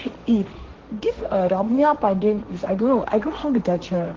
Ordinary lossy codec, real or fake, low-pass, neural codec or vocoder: Opus, 16 kbps; fake; 7.2 kHz; codec, 16 kHz, 1.1 kbps, Voila-Tokenizer